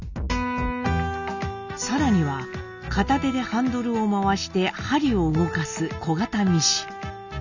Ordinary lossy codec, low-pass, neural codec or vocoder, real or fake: none; 7.2 kHz; none; real